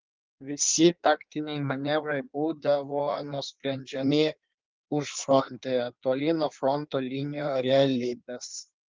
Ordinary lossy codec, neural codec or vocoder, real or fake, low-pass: Opus, 32 kbps; codec, 16 kHz in and 24 kHz out, 1.1 kbps, FireRedTTS-2 codec; fake; 7.2 kHz